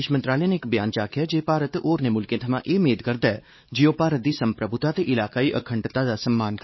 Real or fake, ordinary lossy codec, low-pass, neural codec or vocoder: fake; MP3, 24 kbps; 7.2 kHz; codec, 16 kHz, 4 kbps, X-Codec, WavLM features, trained on Multilingual LibriSpeech